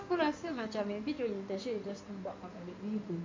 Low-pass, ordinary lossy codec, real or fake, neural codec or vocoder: 7.2 kHz; MP3, 48 kbps; fake; codec, 16 kHz in and 24 kHz out, 2.2 kbps, FireRedTTS-2 codec